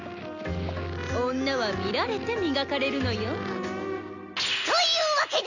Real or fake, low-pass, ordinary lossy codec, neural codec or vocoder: real; 7.2 kHz; none; none